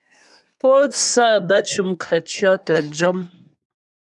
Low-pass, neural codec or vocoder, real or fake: 10.8 kHz; codec, 24 kHz, 1 kbps, SNAC; fake